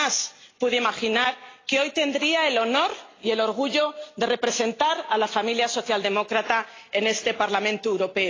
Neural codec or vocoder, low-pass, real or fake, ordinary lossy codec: none; 7.2 kHz; real; AAC, 32 kbps